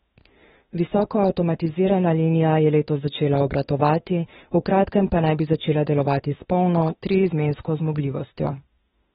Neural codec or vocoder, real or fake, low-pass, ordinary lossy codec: autoencoder, 48 kHz, 32 numbers a frame, DAC-VAE, trained on Japanese speech; fake; 19.8 kHz; AAC, 16 kbps